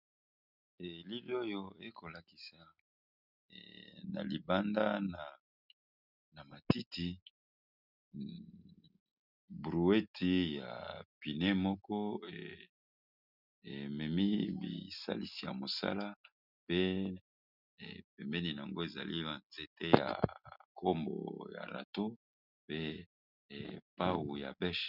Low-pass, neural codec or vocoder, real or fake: 5.4 kHz; none; real